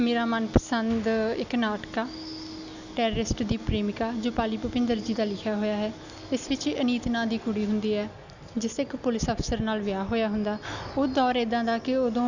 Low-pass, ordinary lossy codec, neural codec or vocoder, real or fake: 7.2 kHz; none; none; real